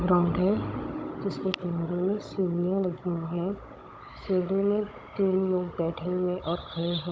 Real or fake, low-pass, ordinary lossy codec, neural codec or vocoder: fake; none; none; codec, 16 kHz, 16 kbps, FunCodec, trained on Chinese and English, 50 frames a second